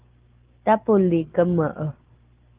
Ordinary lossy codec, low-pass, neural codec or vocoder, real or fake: Opus, 16 kbps; 3.6 kHz; none; real